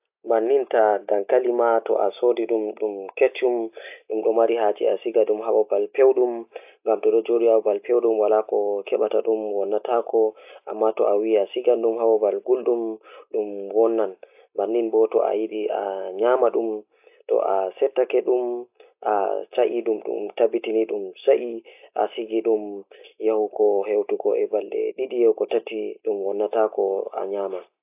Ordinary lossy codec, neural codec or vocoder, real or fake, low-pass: AAC, 32 kbps; none; real; 3.6 kHz